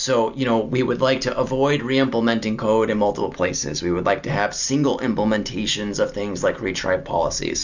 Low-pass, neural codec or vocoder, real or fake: 7.2 kHz; none; real